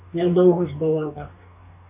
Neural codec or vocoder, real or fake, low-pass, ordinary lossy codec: codec, 44.1 kHz, 2.6 kbps, DAC; fake; 3.6 kHz; MP3, 24 kbps